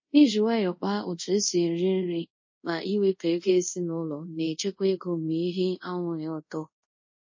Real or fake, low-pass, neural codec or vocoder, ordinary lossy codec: fake; 7.2 kHz; codec, 24 kHz, 0.5 kbps, DualCodec; MP3, 32 kbps